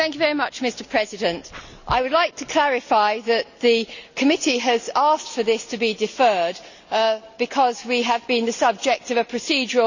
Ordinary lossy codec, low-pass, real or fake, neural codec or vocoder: none; 7.2 kHz; real; none